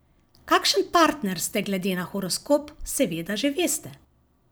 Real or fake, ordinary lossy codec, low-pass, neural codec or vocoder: fake; none; none; vocoder, 44.1 kHz, 128 mel bands every 256 samples, BigVGAN v2